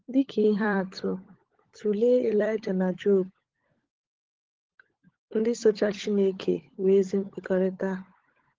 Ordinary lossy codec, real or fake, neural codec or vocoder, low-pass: Opus, 16 kbps; fake; codec, 16 kHz, 16 kbps, FunCodec, trained on LibriTTS, 50 frames a second; 7.2 kHz